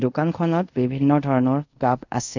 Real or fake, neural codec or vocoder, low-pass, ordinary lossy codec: fake; codec, 24 kHz, 0.5 kbps, DualCodec; 7.2 kHz; none